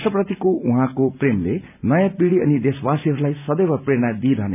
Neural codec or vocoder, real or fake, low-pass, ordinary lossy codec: none; real; 3.6 kHz; none